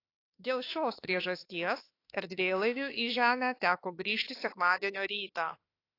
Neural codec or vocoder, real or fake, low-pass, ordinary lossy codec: autoencoder, 48 kHz, 32 numbers a frame, DAC-VAE, trained on Japanese speech; fake; 5.4 kHz; AAC, 32 kbps